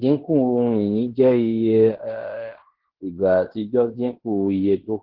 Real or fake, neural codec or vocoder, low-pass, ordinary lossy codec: fake; codec, 16 kHz in and 24 kHz out, 0.9 kbps, LongCat-Audio-Codec, fine tuned four codebook decoder; 5.4 kHz; Opus, 16 kbps